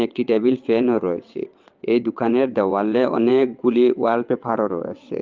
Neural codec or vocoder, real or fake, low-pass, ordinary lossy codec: vocoder, 22.05 kHz, 80 mel bands, WaveNeXt; fake; 7.2 kHz; Opus, 32 kbps